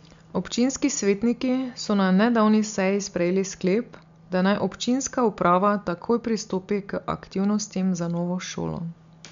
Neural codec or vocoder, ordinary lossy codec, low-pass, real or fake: none; MP3, 64 kbps; 7.2 kHz; real